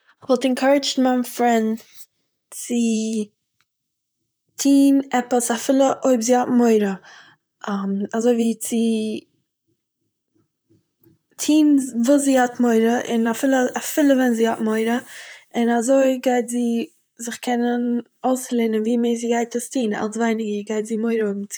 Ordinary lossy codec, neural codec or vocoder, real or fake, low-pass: none; vocoder, 44.1 kHz, 128 mel bands, Pupu-Vocoder; fake; none